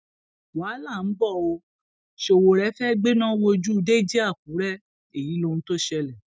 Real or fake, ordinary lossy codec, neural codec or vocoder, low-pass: real; none; none; none